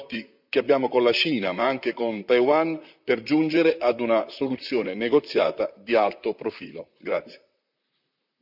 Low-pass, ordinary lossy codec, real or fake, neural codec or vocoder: 5.4 kHz; none; fake; vocoder, 44.1 kHz, 128 mel bands, Pupu-Vocoder